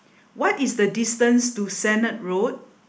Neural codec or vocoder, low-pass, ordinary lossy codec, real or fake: none; none; none; real